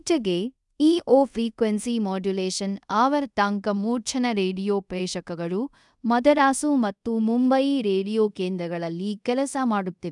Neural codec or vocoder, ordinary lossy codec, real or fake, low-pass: codec, 24 kHz, 0.5 kbps, DualCodec; none; fake; 10.8 kHz